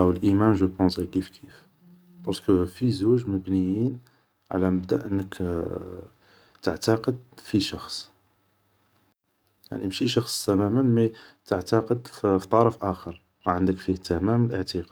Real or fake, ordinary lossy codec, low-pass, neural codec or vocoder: fake; none; none; codec, 44.1 kHz, 7.8 kbps, DAC